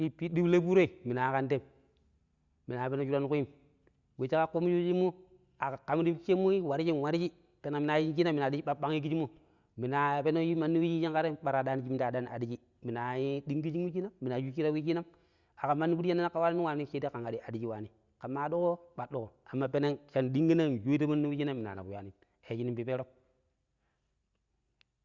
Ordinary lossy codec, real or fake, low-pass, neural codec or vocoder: Opus, 64 kbps; real; 7.2 kHz; none